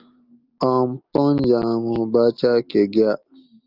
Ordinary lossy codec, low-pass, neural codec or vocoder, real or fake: Opus, 24 kbps; 5.4 kHz; none; real